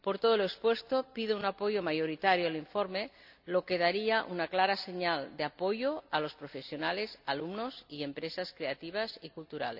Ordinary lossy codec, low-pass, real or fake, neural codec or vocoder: none; 5.4 kHz; real; none